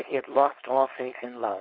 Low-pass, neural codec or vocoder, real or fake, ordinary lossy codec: 5.4 kHz; codec, 16 kHz, 4.8 kbps, FACodec; fake; MP3, 32 kbps